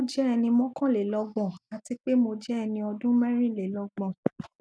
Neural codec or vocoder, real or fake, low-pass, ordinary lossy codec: none; real; none; none